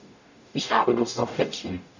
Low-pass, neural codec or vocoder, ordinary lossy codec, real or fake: 7.2 kHz; codec, 44.1 kHz, 0.9 kbps, DAC; none; fake